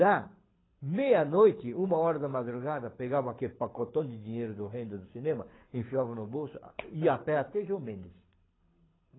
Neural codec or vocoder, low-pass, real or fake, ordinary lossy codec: codec, 24 kHz, 6 kbps, HILCodec; 7.2 kHz; fake; AAC, 16 kbps